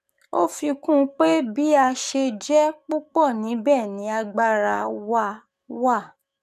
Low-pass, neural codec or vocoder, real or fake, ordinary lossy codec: 14.4 kHz; codec, 44.1 kHz, 7.8 kbps, DAC; fake; none